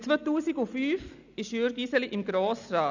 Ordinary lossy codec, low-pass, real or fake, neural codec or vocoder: none; 7.2 kHz; real; none